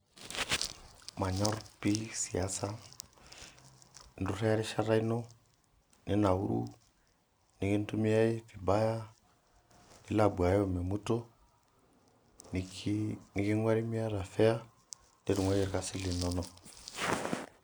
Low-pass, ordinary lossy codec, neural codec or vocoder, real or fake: none; none; none; real